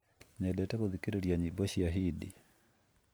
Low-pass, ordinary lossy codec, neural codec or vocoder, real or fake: none; none; none; real